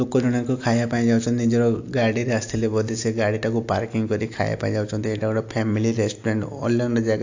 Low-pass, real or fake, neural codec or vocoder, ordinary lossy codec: 7.2 kHz; real; none; AAC, 48 kbps